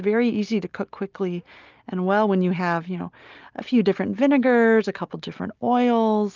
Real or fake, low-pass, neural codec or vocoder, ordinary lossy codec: real; 7.2 kHz; none; Opus, 32 kbps